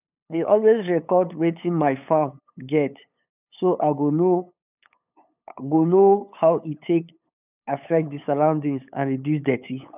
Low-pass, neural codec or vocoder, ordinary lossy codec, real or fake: 3.6 kHz; codec, 16 kHz, 8 kbps, FunCodec, trained on LibriTTS, 25 frames a second; none; fake